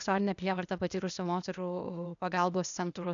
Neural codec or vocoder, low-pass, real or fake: codec, 16 kHz, 0.8 kbps, ZipCodec; 7.2 kHz; fake